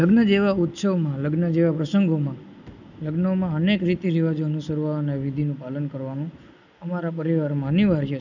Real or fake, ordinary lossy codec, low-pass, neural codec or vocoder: real; none; 7.2 kHz; none